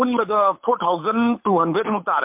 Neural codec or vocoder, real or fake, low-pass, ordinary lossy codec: codec, 24 kHz, 6 kbps, HILCodec; fake; 3.6 kHz; MP3, 32 kbps